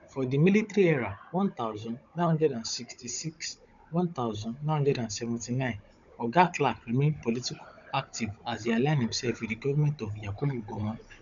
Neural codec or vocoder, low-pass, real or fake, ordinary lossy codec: codec, 16 kHz, 16 kbps, FunCodec, trained on Chinese and English, 50 frames a second; 7.2 kHz; fake; none